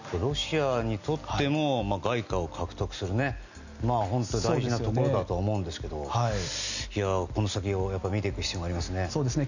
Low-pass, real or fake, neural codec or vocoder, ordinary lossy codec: 7.2 kHz; real; none; none